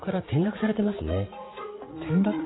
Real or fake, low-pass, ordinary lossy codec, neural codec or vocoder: fake; 7.2 kHz; AAC, 16 kbps; vocoder, 44.1 kHz, 80 mel bands, Vocos